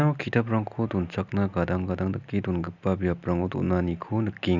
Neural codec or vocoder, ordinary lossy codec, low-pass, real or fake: none; none; 7.2 kHz; real